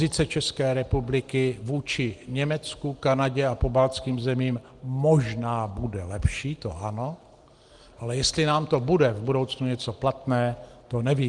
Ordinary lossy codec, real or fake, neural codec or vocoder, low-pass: Opus, 24 kbps; real; none; 10.8 kHz